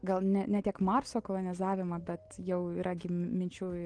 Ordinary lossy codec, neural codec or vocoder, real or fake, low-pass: Opus, 16 kbps; none; real; 9.9 kHz